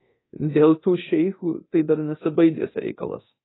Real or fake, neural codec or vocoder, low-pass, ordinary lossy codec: fake; codec, 24 kHz, 0.9 kbps, DualCodec; 7.2 kHz; AAC, 16 kbps